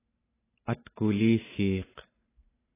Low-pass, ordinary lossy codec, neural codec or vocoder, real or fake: 3.6 kHz; AAC, 16 kbps; none; real